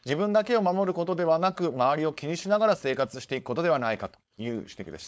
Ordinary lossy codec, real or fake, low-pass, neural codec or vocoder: none; fake; none; codec, 16 kHz, 4.8 kbps, FACodec